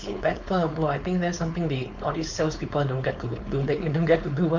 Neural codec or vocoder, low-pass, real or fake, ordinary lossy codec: codec, 16 kHz, 4.8 kbps, FACodec; 7.2 kHz; fake; none